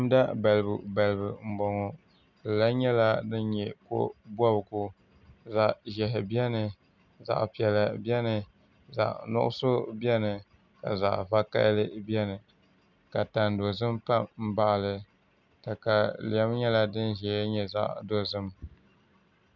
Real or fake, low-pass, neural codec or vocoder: real; 7.2 kHz; none